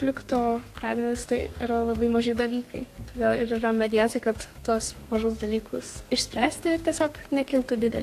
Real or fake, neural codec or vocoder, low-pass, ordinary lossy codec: fake; codec, 32 kHz, 1.9 kbps, SNAC; 14.4 kHz; AAC, 64 kbps